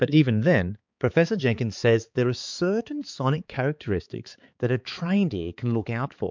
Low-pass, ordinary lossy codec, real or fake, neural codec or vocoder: 7.2 kHz; MP3, 64 kbps; fake; codec, 16 kHz, 4 kbps, X-Codec, HuBERT features, trained on balanced general audio